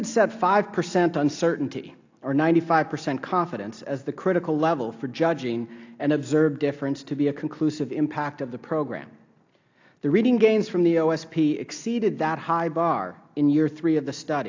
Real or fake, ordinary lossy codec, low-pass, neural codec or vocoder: real; AAC, 48 kbps; 7.2 kHz; none